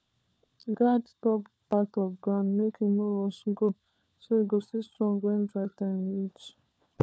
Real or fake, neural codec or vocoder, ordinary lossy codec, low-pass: fake; codec, 16 kHz, 4 kbps, FunCodec, trained on LibriTTS, 50 frames a second; none; none